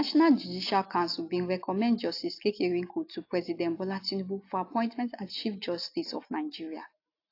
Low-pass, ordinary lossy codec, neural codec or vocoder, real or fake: 5.4 kHz; AAC, 32 kbps; none; real